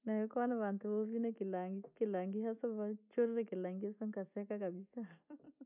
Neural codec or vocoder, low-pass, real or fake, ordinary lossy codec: none; 3.6 kHz; real; none